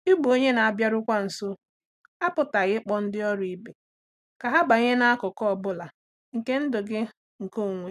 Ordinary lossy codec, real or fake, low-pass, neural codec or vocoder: none; real; 14.4 kHz; none